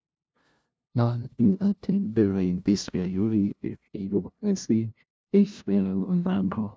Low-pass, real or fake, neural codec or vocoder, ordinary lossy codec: none; fake; codec, 16 kHz, 0.5 kbps, FunCodec, trained on LibriTTS, 25 frames a second; none